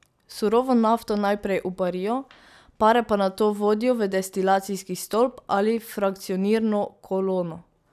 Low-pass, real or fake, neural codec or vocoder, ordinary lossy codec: 14.4 kHz; real; none; none